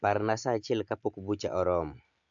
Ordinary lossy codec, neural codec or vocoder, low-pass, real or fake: AAC, 64 kbps; none; 7.2 kHz; real